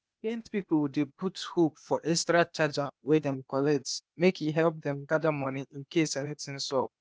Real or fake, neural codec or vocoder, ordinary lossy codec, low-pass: fake; codec, 16 kHz, 0.8 kbps, ZipCodec; none; none